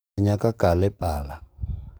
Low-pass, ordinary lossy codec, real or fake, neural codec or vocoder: none; none; fake; codec, 44.1 kHz, 7.8 kbps, DAC